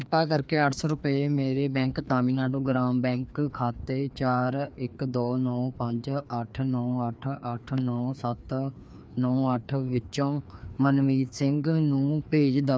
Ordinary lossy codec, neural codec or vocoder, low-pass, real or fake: none; codec, 16 kHz, 2 kbps, FreqCodec, larger model; none; fake